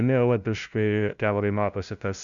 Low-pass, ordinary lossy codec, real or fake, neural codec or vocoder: 7.2 kHz; Opus, 64 kbps; fake; codec, 16 kHz, 0.5 kbps, FunCodec, trained on LibriTTS, 25 frames a second